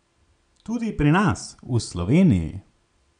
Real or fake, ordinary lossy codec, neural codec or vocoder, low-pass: real; none; none; 9.9 kHz